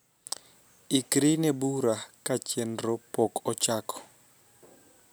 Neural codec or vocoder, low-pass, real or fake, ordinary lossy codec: none; none; real; none